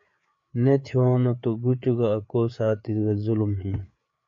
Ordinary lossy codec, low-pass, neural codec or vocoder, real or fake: MP3, 48 kbps; 7.2 kHz; codec, 16 kHz, 4 kbps, FreqCodec, larger model; fake